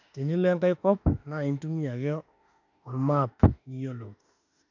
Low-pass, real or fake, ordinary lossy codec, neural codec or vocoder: 7.2 kHz; fake; none; autoencoder, 48 kHz, 32 numbers a frame, DAC-VAE, trained on Japanese speech